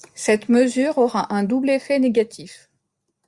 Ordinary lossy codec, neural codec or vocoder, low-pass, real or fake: Opus, 64 kbps; none; 10.8 kHz; real